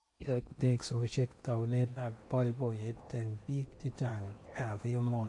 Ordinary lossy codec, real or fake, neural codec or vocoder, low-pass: MP3, 48 kbps; fake; codec, 16 kHz in and 24 kHz out, 0.8 kbps, FocalCodec, streaming, 65536 codes; 10.8 kHz